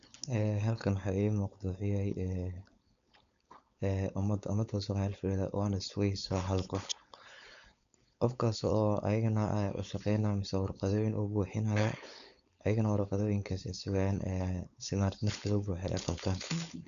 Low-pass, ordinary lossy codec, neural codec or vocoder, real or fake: 7.2 kHz; none; codec, 16 kHz, 4.8 kbps, FACodec; fake